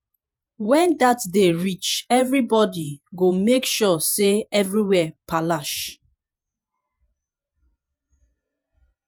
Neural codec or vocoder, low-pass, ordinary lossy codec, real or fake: vocoder, 48 kHz, 128 mel bands, Vocos; none; none; fake